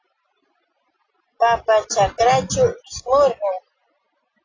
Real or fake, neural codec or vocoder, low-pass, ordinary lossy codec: real; none; 7.2 kHz; AAC, 32 kbps